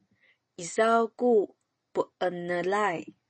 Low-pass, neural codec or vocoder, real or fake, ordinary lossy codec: 10.8 kHz; none; real; MP3, 32 kbps